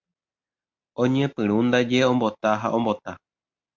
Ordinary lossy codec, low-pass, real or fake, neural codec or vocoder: MP3, 64 kbps; 7.2 kHz; real; none